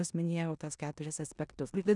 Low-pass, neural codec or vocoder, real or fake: 10.8 kHz; codec, 16 kHz in and 24 kHz out, 0.9 kbps, LongCat-Audio-Codec, four codebook decoder; fake